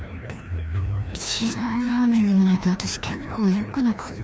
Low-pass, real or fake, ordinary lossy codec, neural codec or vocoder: none; fake; none; codec, 16 kHz, 1 kbps, FreqCodec, larger model